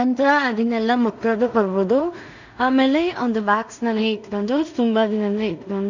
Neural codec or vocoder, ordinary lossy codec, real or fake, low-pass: codec, 16 kHz in and 24 kHz out, 0.4 kbps, LongCat-Audio-Codec, two codebook decoder; none; fake; 7.2 kHz